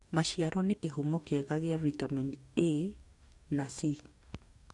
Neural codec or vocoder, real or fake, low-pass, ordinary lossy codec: codec, 44.1 kHz, 2.6 kbps, DAC; fake; 10.8 kHz; none